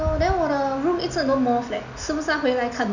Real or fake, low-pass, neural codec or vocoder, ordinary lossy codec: real; 7.2 kHz; none; none